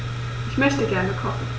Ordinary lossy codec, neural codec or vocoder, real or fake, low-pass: none; none; real; none